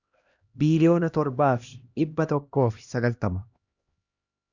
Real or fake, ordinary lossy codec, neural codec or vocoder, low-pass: fake; Opus, 64 kbps; codec, 16 kHz, 1 kbps, X-Codec, HuBERT features, trained on LibriSpeech; 7.2 kHz